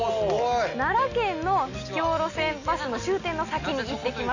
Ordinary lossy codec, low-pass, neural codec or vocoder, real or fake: none; 7.2 kHz; none; real